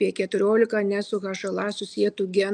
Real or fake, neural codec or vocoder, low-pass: real; none; 9.9 kHz